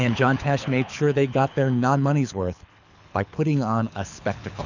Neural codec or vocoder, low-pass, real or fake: codec, 24 kHz, 6 kbps, HILCodec; 7.2 kHz; fake